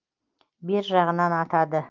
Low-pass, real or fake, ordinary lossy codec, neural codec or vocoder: 7.2 kHz; real; Opus, 24 kbps; none